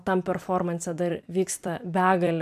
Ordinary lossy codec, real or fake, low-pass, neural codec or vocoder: AAC, 96 kbps; fake; 14.4 kHz; vocoder, 44.1 kHz, 128 mel bands every 256 samples, BigVGAN v2